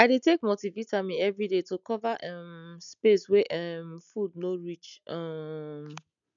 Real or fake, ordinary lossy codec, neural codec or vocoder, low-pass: real; none; none; 7.2 kHz